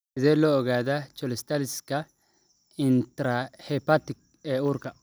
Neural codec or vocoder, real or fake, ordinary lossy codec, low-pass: none; real; none; none